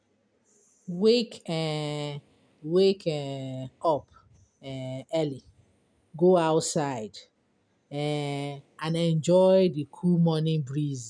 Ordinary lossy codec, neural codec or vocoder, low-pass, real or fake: none; none; 9.9 kHz; real